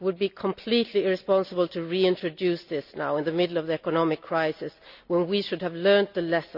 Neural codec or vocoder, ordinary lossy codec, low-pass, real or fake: none; none; 5.4 kHz; real